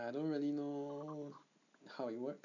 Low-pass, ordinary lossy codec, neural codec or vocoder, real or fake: 7.2 kHz; none; none; real